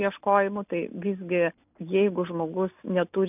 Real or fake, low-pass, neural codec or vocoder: real; 3.6 kHz; none